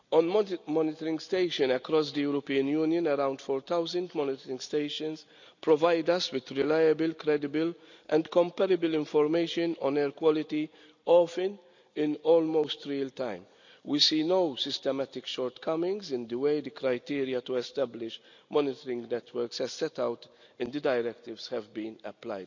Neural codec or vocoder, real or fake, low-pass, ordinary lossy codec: none; real; 7.2 kHz; none